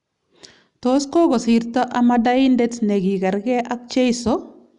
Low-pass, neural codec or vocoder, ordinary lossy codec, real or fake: 10.8 kHz; none; none; real